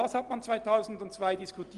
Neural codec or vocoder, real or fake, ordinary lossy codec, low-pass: none; real; Opus, 24 kbps; 10.8 kHz